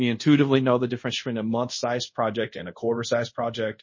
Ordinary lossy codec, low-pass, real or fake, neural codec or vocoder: MP3, 32 kbps; 7.2 kHz; fake; codec, 24 kHz, 0.5 kbps, DualCodec